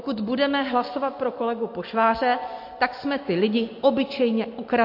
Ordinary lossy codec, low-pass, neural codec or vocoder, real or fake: MP3, 32 kbps; 5.4 kHz; none; real